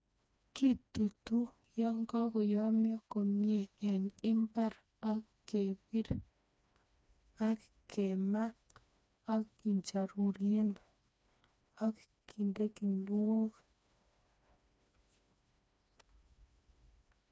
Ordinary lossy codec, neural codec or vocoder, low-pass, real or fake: none; codec, 16 kHz, 2 kbps, FreqCodec, smaller model; none; fake